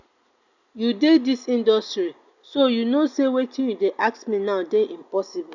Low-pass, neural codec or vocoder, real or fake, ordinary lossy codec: 7.2 kHz; none; real; none